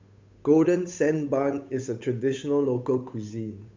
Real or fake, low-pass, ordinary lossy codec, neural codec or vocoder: fake; 7.2 kHz; MP3, 64 kbps; codec, 16 kHz, 8 kbps, FunCodec, trained on Chinese and English, 25 frames a second